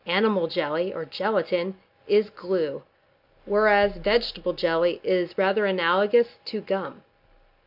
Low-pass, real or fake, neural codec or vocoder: 5.4 kHz; real; none